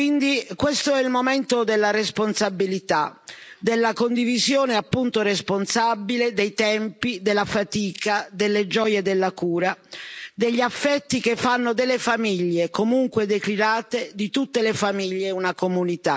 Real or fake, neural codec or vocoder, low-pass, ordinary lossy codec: real; none; none; none